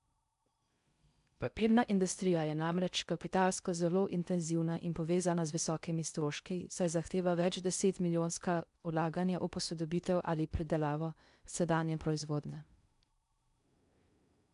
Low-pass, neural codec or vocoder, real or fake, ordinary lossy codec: 10.8 kHz; codec, 16 kHz in and 24 kHz out, 0.6 kbps, FocalCodec, streaming, 4096 codes; fake; none